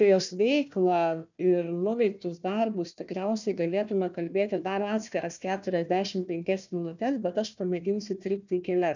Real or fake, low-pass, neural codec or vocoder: fake; 7.2 kHz; codec, 16 kHz, 1 kbps, FunCodec, trained on Chinese and English, 50 frames a second